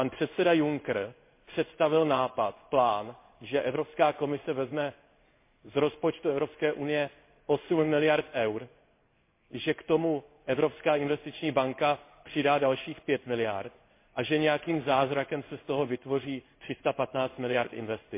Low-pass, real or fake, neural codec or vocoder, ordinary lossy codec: 3.6 kHz; fake; codec, 16 kHz in and 24 kHz out, 1 kbps, XY-Tokenizer; MP3, 24 kbps